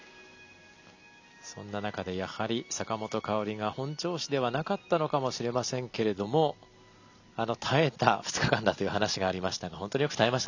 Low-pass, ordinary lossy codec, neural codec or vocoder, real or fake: 7.2 kHz; none; none; real